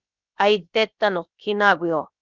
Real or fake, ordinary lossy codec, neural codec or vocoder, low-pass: fake; Opus, 64 kbps; codec, 16 kHz, about 1 kbps, DyCAST, with the encoder's durations; 7.2 kHz